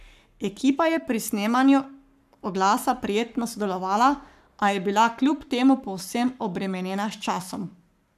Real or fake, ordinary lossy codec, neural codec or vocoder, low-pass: fake; none; codec, 44.1 kHz, 7.8 kbps, Pupu-Codec; 14.4 kHz